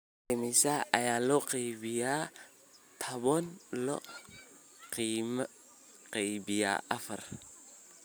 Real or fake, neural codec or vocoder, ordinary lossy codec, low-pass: real; none; none; none